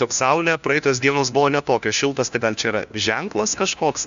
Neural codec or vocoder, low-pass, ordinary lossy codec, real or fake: codec, 16 kHz, 1 kbps, FunCodec, trained on LibriTTS, 50 frames a second; 7.2 kHz; AAC, 96 kbps; fake